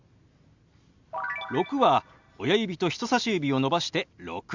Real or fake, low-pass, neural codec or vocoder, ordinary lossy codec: real; 7.2 kHz; none; Opus, 64 kbps